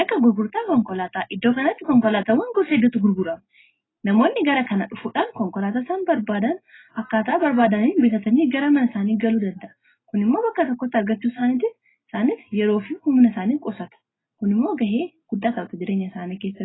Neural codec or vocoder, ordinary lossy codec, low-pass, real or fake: none; AAC, 16 kbps; 7.2 kHz; real